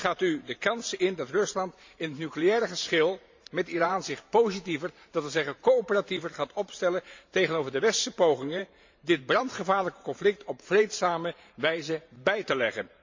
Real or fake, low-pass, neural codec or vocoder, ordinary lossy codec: fake; 7.2 kHz; vocoder, 44.1 kHz, 128 mel bands every 512 samples, BigVGAN v2; MP3, 64 kbps